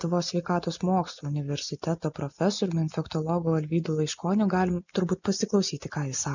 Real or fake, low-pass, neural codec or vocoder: real; 7.2 kHz; none